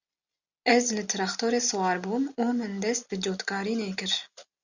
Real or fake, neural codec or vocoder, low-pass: real; none; 7.2 kHz